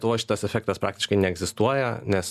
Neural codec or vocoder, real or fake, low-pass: none; real; 14.4 kHz